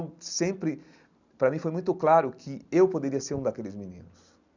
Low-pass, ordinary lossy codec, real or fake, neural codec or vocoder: 7.2 kHz; none; real; none